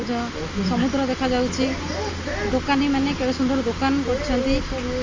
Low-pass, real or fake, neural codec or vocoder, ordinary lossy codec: 7.2 kHz; real; none; Opus, 32 kbps